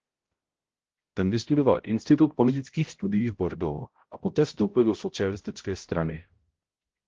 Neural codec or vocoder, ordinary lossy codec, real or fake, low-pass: codec, 16 kHz, 0.5 kbps, X-Codec, HuBERT features, trained on balanced general audio; Opus, 16 kbps; fake; 7.2 kHz